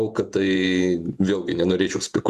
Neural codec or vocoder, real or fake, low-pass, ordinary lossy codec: vocoder, 48 kHz, 128 mel bands, Vocos; fake; 14.4 kHz; Opus, 64 kbps